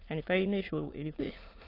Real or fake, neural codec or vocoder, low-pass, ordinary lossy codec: fake; autoencoder, 22.05 kHz, a latent of 192 numbers a frame, VITS, trained on many speakers; 5.4 kHz; AAC, 24 kbps